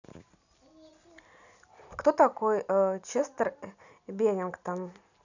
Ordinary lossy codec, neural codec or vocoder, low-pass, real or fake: none; none; 7.2 kHz; real